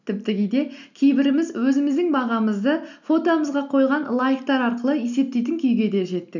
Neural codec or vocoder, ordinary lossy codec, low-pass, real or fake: none; none; 7.2 kHz; real